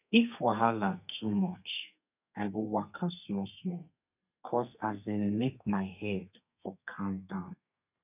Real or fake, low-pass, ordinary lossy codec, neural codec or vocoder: fake; 3.6 kHz; none; codec, 32 kHz, 1.9 kbps, SNAC